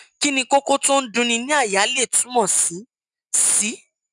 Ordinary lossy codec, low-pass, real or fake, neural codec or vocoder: none; 10.8 kHz; real; none